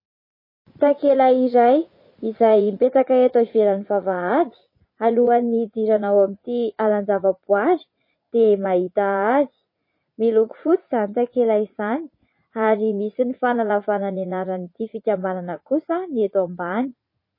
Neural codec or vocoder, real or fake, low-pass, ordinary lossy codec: vocoder, 44.1 kHz, 80 mel bands, Vocos; fake; 5.4 kHz; MP3, 24 kbps